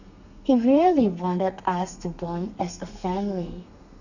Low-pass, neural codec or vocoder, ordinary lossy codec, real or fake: 7.2 kHz; codec, 32 kHz, 1.9 kbps, SNAC; none; fake